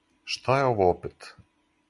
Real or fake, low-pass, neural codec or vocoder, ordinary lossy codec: real; 10.8 kHz; none; Opus, 64 kbps